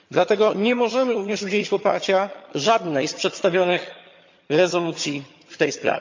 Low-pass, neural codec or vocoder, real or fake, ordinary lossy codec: 7.2 kHz; vocoder, 22.05 kHz, 80 mel bands, HiFi-GAN; fake; MP3, 48 kbps